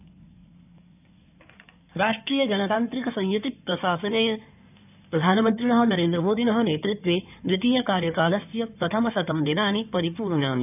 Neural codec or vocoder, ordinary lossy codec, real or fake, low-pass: codec, 16 kHz in and 24 kHz out, 2.2 kbps, FireRedTTS-2 codec; none; fake; 3.6 kHz